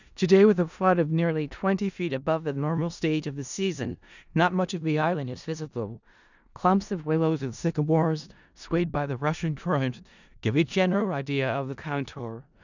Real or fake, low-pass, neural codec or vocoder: fake; 7.2 kHz; codec, 16 kHz in and 24 kHz out, 0.4 kbps, LongCat-Audio-Codec, four codebook decoder